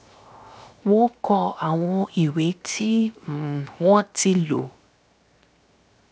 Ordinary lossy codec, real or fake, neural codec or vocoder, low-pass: none; fake; codec, 16 kHz, 0.7 kbps, FocalCodec; none